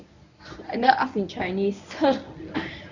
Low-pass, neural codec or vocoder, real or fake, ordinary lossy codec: 7.2 kHz; codec, 24 kHz, 0.9 kbps, WavTokenizer, medium speech release version 1; fake; none